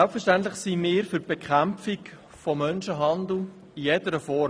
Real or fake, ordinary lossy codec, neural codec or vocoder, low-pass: real; none; none; none